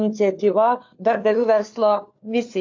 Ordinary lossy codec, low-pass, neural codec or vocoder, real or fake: AAC, 48 kbps; 7.2 kHz; codec, 16 kHz, 4 kbps, FunCodec, trained on LibriTTS, 50 frames a second; fake